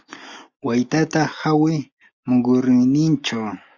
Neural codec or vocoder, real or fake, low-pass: none; real; 7.2 kHz